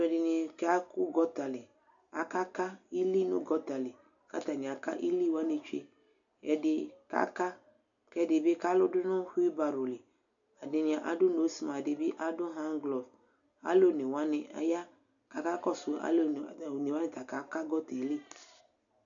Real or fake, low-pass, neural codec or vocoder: real; 7.2 kHz; none